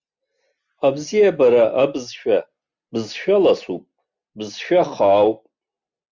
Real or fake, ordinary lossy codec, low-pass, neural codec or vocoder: real; Opus, 64 kbps; 7.2 kHz; none